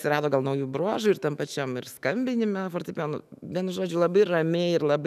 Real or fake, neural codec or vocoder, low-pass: fake; autoencoder, 48 kHz, 128 numbers a frame, DAC-VAE, trained on Japanese speech; 14.4 kHz